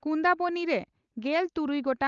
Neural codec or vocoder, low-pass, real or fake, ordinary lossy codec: none; 7.2 kHz; real; Opus, 24 kbps